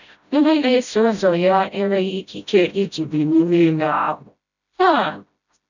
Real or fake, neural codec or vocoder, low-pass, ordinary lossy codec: fake; codec, 16 kHz, 0.5 kbps, FreqCodec, smaller model; 7.2 kHz; none